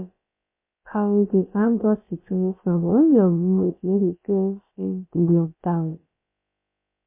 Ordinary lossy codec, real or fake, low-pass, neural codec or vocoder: MP3, 24 kbps; fake; 3.6 kHz; codec, 16 kHz, about 1 kbps, DyCAST, with the encoder's durations